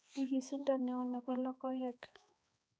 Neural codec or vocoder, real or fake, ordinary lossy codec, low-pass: codec, 16 kHz, 2 kbps, X-Codec, HuBERT features, trained on balanced general audio; fake; none; none